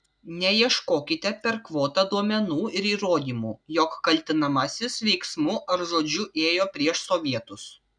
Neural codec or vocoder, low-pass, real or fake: none; 9.9 kHz; real